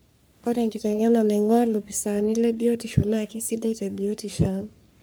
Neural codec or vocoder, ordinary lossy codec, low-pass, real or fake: codec, 44.1 kHz, 3.4 kbps, Pupu-Codec; none; none; fake